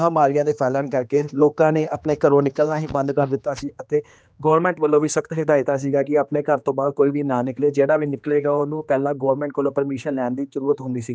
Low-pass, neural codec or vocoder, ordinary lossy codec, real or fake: none; codec, 16 kHz, 2 kbps, X-Codec, HuBERT features, trained on general audio; none; fake